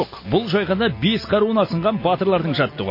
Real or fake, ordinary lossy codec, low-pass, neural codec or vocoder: real; MP3, 24 kbps; 5.4 kHz; none